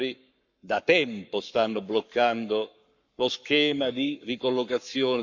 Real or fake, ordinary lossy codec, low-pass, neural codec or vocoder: fake; none; 7.2 kHz; codec, 44.1 kHz, 7.8 kbps, Pupu-Codec